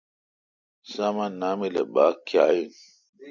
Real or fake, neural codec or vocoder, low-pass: real; none; 7.2 kHz